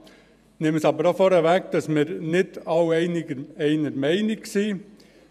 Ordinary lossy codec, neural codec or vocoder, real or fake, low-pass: none; vocoder, 48 kHz, 128 mel bands, Vocos; fake; 14.4 kHz